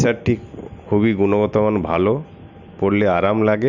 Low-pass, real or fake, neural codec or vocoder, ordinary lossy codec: 7.2 kHz; real; none; none